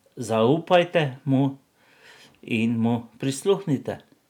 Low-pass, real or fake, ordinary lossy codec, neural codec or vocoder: 19.8 kHz; real; none; none